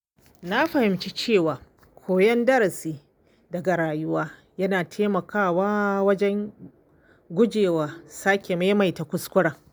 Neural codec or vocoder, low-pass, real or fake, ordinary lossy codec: none; none; real; none